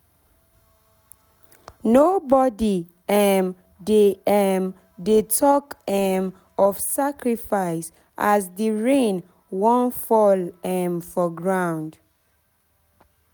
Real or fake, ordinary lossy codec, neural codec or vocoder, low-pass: real; none; none; none